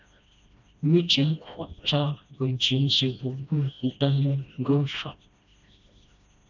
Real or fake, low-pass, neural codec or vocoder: fake; 7.2 kHz; codec, 16 kHz, 1 kbps, FreqCodec, smaller model